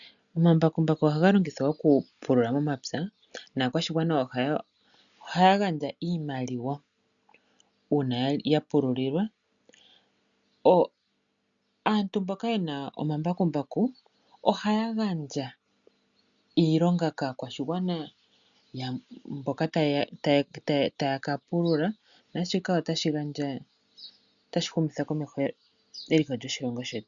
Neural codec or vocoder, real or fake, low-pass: none; real; 7.2 kHz